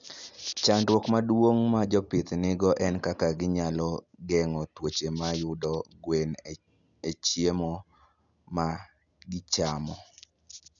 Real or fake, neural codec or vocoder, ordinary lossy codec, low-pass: real; none; none; 7.2 kHz